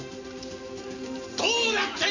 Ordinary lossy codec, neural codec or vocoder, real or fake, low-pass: AAC, 48 kbps; none; real; 7.2 kHz